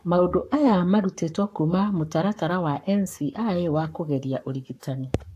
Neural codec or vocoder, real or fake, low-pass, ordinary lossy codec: codec, 44.1 kHz, 7.8 kbps, Pupu-Codec; fake; 14.4 kHz; AAC, 64 kbps